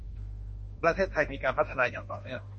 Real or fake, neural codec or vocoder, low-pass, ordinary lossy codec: fake; autoencoder, 48 kHz, 32 numbers a frame, DAC-VAE, trained on Japanese speech; 10.8 kHz; MP3, 32 kbps